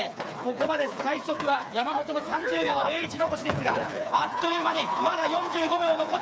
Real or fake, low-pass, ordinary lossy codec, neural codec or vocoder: fake; none; none; codec, 16 kHz, 4 kbps, FreqCodec, smaller model